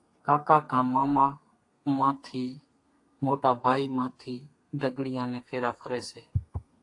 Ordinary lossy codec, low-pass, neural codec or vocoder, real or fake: AAC, 48 kbps; 10.8 kHz; codec, 32 kHz, 1.9 kbps, SNAC; fake